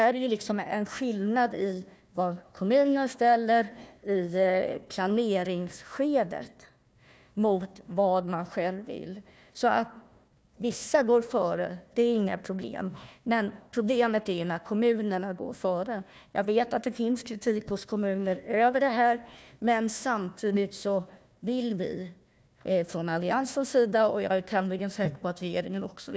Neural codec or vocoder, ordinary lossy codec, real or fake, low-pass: codec, 16 kHz, 1 kbps, FunCodec, trained on Chinese and English, 50 frames a second; none; fake; none